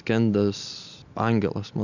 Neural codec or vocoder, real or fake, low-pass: none; real; 7.2 kHz